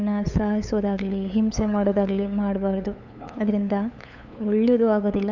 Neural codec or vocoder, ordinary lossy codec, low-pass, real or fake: codec, 16 kHz, 8 kbps, FunCodec, trained on LibriTTS, 25 frames a second; none; 7.2 kHz; fake